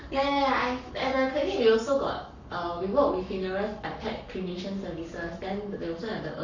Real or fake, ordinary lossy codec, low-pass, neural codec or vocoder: fake; none; 7.2 kHz; codec, 44.1 kHz, 7.8 kbps, Pupu-Codec